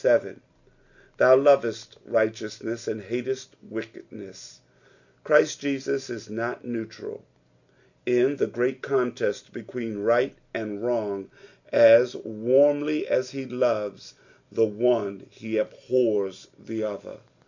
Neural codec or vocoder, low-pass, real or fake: none; 7.2 kHz; real